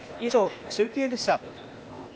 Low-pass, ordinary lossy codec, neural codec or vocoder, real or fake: none; none; codec, 16 kHz, 0.8 kbps, ZipCodec; fake